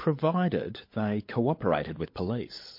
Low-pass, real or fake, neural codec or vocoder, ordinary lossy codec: 5.4 kHz; fake; codec, 16 kHz, 16 kbps, FunCodec, trained on LibriTTS, 50 frames a second; MP3, 32 kbps